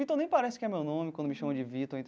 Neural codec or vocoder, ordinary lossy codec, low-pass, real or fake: none; none; none; real